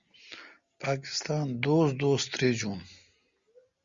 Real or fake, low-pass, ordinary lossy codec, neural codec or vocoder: real; 7.2 kHz; Opus, 64 kbps; none